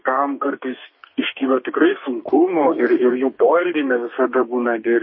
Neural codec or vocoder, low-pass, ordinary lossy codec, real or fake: codec, 32 kHz, 1.9 kbps, SNAC; 7.2 kHz; MP3, 24 kbps; fake